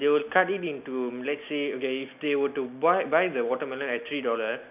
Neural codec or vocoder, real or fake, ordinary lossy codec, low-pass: none; real; none; 3.6 kHz